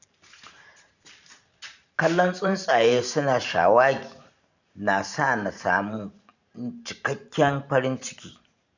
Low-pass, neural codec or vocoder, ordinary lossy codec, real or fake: 7.2 kHz; vocoder, 44.1 kHz, 128 mel bands every 512 samples, BigVGAN v2; none; fake